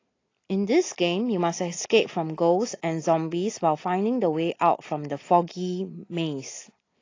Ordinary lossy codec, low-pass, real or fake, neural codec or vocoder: AAC, 32 kbps; 7.2 kHz; real; none